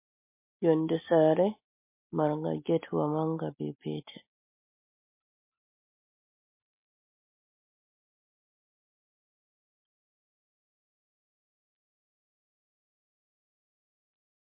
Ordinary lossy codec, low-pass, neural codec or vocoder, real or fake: MP3, 24 kbps; 3.6 kHz; none; real